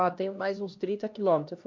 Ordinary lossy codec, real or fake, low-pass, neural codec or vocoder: MP3, 48 kbps; fake; 7.2 kHz; codec, 16 kHz, 1 kbps, X-Codec, HuBERT features, trained on LibriSpeech